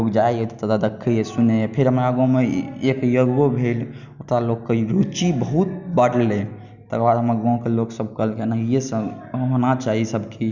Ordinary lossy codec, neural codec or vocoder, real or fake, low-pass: none; none; real; 7.2 kHz